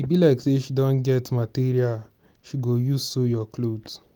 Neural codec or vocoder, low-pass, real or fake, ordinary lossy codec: none; none; real; none